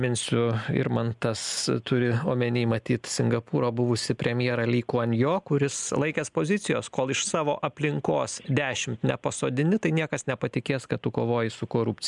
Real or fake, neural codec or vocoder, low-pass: real; none; 10.8 kHz